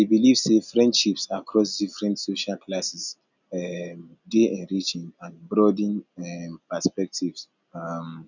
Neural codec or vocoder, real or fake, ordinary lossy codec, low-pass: none; real; none; 7.2 kHz